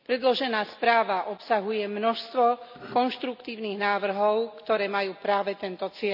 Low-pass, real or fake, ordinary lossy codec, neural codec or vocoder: 5.4 kHz; real; none; none